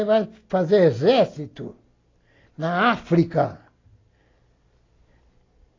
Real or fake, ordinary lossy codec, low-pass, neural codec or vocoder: real; AAC, 32 kbps; 7.2 kHz; none